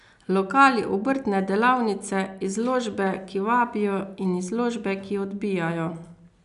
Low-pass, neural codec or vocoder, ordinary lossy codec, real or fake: 10.8 kHz; none; none; real